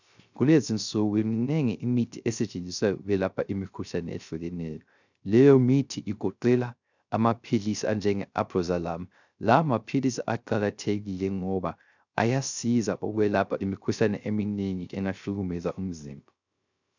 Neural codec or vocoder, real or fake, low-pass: codec, 16 kHz, 0.3 kbps, FocalCodec; fake; 7.2 kHz